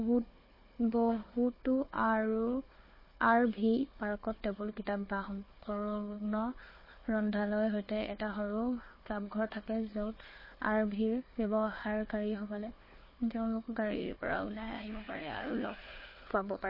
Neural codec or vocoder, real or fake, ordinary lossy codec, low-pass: codec, 16 kHz, 4 kbps, FunCodec, trained on Chinese and English, 50 frames a second; fake; MP3, 24 kbps; 5.4 kHz